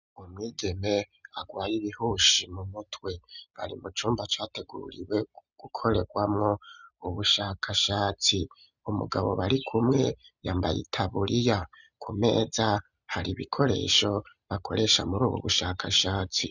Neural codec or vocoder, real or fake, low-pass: none; real; 7.2 kHz